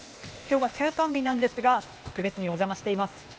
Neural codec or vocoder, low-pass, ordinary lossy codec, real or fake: codec, 16 kHz, 0.8 kbps, ZipCodec; none; none; fake